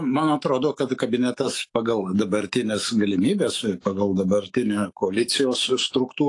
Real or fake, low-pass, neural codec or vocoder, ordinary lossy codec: fake; 10.8 kHz; autoencoder, 48 kHz, 128 numbers a frame, DAC-VAE, trained on Japanese speech; AAC, 48 kbps